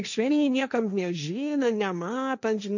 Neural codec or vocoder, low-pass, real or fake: codec, 16 kHz, 1.1 kbps, Voila-Tokenizer; 7.2 kHz; fake